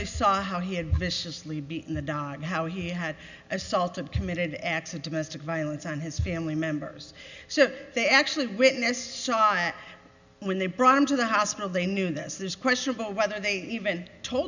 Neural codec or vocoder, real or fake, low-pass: none; real; 7.2 kHz